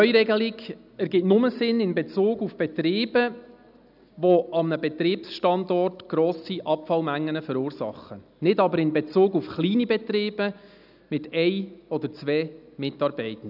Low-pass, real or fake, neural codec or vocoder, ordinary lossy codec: 5.4 kHz; real; none; none